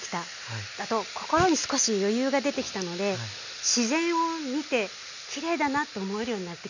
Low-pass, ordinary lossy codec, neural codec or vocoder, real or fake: 7.2 kHz; none; none; real